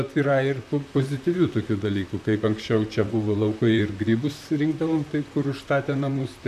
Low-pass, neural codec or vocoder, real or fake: 14.4 kHz; vocoder, 44.1 kHz, 128 mel bands, Pupu-Vocoder; fake